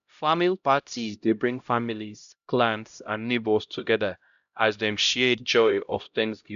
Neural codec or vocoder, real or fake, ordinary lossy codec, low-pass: codec, 16 kHz, 0.5 kbps, X-Codec, HuBERT features, trained on LibriSpeech; fake; none; 7.2 kHz